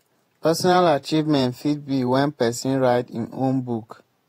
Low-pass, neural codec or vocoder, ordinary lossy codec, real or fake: 19.8 kHz; vocoder, 48 kHz, 128 mel bands, Vocos; AAC, 48 kbps; fake